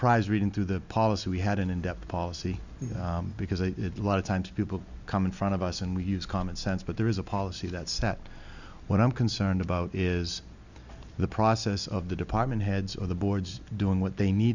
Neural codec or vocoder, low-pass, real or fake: none; 7.2 kHz; real